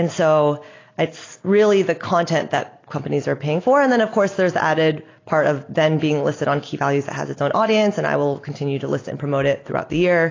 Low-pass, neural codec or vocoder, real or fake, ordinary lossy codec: 7.2 kHz; none; real; AAC, 32 kbps